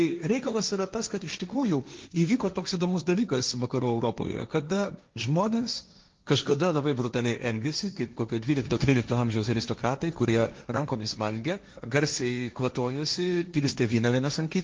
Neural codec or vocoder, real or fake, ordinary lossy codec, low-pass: codec, 16 kHz, 1.1 kbps, Voila-Tokenizer; fake; Opus, 32 kbps; 7.2 kHz